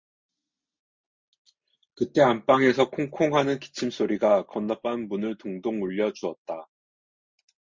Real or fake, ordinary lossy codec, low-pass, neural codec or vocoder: real; MP3, 64 kbps; 7.2 kHz; none